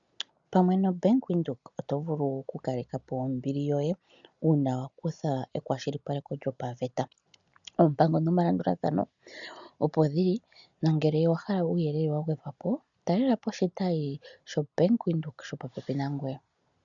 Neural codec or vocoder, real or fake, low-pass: none; real; 7.2 kHz